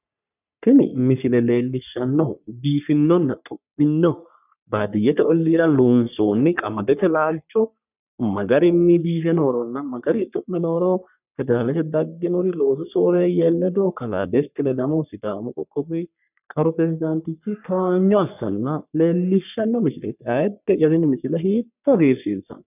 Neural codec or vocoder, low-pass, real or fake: codec, 44.1 kHz, 3.4 kbps, Pupu-Codec; 3.6 kHz; fake